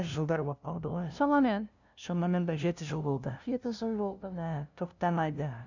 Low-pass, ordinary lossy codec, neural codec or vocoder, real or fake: 7.2 kHz; none; codec, 16 kHz, 0.5 kbps, FunCodec, trained on LibriTTS, 25 frames a second; fake